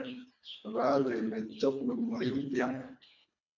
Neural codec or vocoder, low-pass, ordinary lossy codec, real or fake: codec, 24 kHz, 1.5 kbps, HILCodec; 7.2 kHz; AAC, 48 kbps; fake